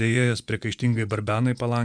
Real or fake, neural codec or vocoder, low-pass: fake; vocoder, 24 kHz, 100 mel bands, Vocos; 9.9 kHz